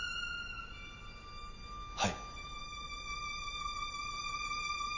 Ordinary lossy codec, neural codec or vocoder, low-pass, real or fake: none; none; 7.2 kHz; real